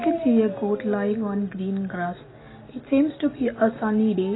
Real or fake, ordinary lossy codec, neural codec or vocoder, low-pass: real; AAC, 16 kbps; none; 7.2 kHz